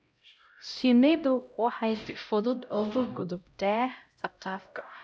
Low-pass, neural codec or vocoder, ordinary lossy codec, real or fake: none; codec, 16 kHz, 0.5 kbps, X-Codec, HuBERT features, trained on LibriSpeech; none; fake